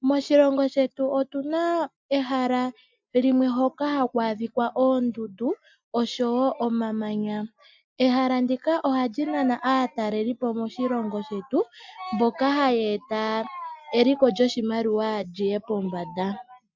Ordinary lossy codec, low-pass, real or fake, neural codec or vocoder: MP3, 64 kbps; 7.2 kHz; real; none